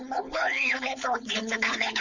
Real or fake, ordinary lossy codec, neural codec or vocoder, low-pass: fake; Opus, 64 kbps; codec, 16 kHz, 4.8 kbps, FACodec; 7.2 kHz